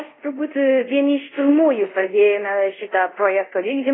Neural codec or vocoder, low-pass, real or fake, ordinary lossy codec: codec, 24 kHz, 0.5 kbps, DualCodec; 7.2 kHz; fake; AAC, 16 kbps